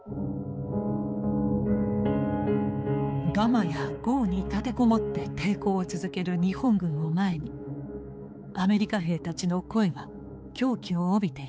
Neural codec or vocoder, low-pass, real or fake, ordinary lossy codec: codec, 16 kHz, 4 kbps, X-Codec, HuBERT features, trained on balanced general audio; none; fake; none